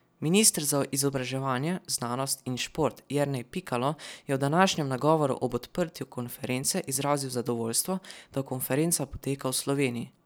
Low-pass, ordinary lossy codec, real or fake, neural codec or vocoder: none; none; real; none